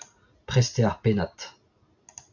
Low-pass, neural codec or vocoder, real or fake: 7.2 kHz; none; real